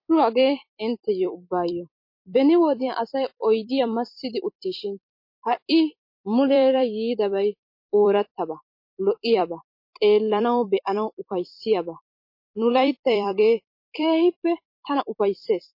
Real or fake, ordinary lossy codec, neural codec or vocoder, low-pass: fake; MP3, 32 kbps; vocoder, 44.1 kHz, 128 mel bands every 256 samples, BigVGAN v2; 5.4 kHz